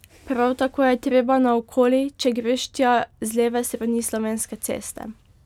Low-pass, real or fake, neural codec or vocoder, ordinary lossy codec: 19.8 kHz; real; none; none